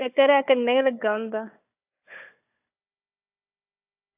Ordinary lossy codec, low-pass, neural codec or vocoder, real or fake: AAC, 24 kbps; 3.6 kHz; codec, 16 kHz, 4 kbps, FunCodec, trained on Chinese and English, 50 frames a second; fake